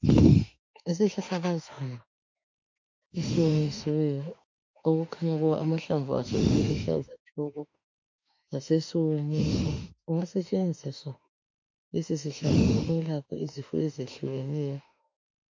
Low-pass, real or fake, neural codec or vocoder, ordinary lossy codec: 7.2 kHz; fake; autoencoder, 48 kHz, 32 numbers a frame, DAC-VAE, trained on Japanese speech; MP3, 48 kbps